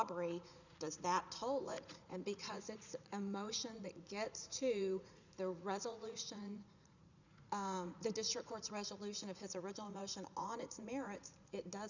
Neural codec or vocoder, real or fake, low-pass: vocoder, 44.1 kHz, 80 mel bands, Vocos; fake; 7.2 kHz